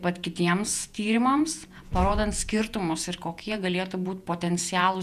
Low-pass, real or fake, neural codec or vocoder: 14.4 kHz; fake; vocoder, 48 kHz, 128 mel bands, Vocos